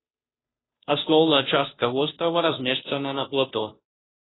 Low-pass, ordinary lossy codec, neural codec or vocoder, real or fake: 7.2 kHz; AAC, 16 kbps; codec, 16 kHz, 0.5 kbps, FunCodec, trained on Chinese and English, 25 frames a second; fake